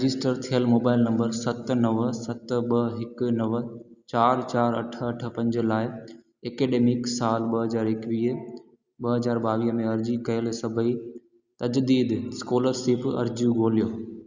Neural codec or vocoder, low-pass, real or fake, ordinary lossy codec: none; 7.2 kHz; real; Opus, 64 kbps